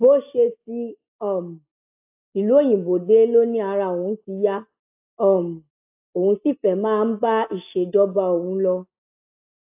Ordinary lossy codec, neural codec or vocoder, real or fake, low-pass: AAC, 32 kbps; none; real; 3.6 kHz